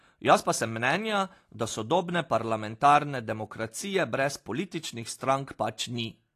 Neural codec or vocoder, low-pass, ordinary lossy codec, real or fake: none; 14.4 kHz; AAC, 48 kbps; real